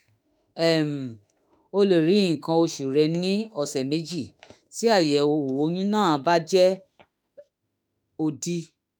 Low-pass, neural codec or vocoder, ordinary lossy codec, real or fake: none; autoencoder, 48 kHz, 32 numbers a frame, DAC-VAE, trained on Japanese speech; none; fake